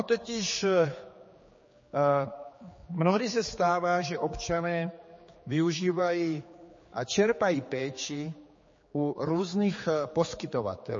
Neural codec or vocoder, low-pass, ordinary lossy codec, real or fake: codec, 16 kHz, 4 kbps, X-Codec, HuBERT features, trained on general audio; 7.2 kHz; MP3, 32 kbps; fake